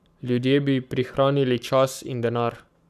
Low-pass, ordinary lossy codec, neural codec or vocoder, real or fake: 14.4 kHz; none; codec, 44.1 kHz, 7.8 kbps, Pupu-Codec; fake